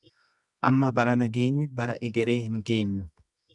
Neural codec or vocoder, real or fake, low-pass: codec, 24 kHz, 0.9 kbps, WavTokenizer, medium music audio release; fake; 10.8 kHz